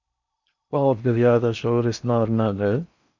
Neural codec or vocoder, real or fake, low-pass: codec, 16 kHz in and 24 kHz out, 0.6 kbps, FocalCodec, streaming, 4096 codes; fake; 7.2 kHz